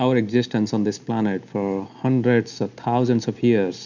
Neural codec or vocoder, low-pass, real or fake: none; 7.2 kHz; real